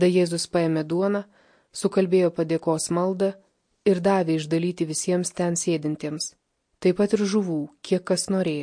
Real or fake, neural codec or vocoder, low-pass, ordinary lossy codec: real; none; 9.9 kHz; MP3, 48 kbps